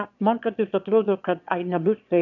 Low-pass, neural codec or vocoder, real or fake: 7.2 kHz; autoencoder, 22.05 kHz, a latent of 192 numbers a frame, VITS, trained on one speaker; fake